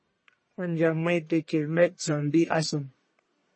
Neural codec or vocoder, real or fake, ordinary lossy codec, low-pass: codec, 44.1 kHz, 1.7 kbps, Pupu-Codec; fake; MP3, 32 kbps; 9.9 kHz